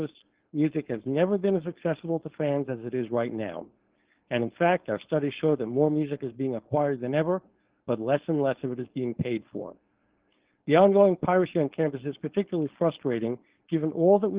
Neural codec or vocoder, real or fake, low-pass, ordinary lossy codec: codec, 16 kHz, 4.8 kbps, FACodec; fake; 3.6 kHz; Opus, 16 kbps